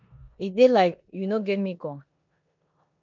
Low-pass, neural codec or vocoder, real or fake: 7.2 kHz; codec, 16 kHz in and 24 kHz out, 0.9 kbps, LongCat-Audio-Codec, four codebook decoder; fake